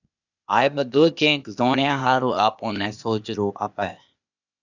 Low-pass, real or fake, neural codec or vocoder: 7.2 kHz; fake; codec, 16 kHz, 0.8 kbps, ZipCodec